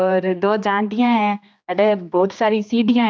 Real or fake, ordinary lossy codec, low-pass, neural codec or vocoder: fake; none; none; codec, 16 kHz, 2 kbps, X-Codec, HuBERT features, trained on general audio